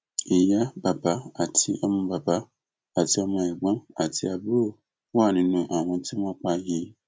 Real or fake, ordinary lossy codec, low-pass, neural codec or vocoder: real; none; none; none